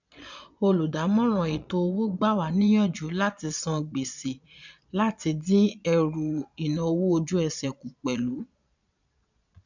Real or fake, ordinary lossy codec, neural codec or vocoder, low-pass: fake; none; vocoder, 22.05 kHz, 80 mel bands, Vocos; 7.2 kHz